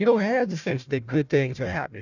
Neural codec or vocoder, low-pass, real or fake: codec, 16 kHz, 1 kbps, FunCodec, trained on Chinese and English, 50 frames a second; 7.2 kHz; fake